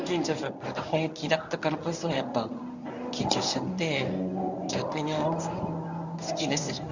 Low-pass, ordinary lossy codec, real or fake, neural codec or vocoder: 7.2 kHz; none; fake; codec, 24 kHz, 0.9 kbps, WavTokenizer, medium speech release version 1